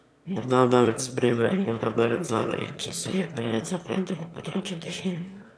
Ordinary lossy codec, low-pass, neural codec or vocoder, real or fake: none; none; autoencoder, 22.05 kHz, a latent of 192 numbers a frame, VITS, trained on one speaker; fake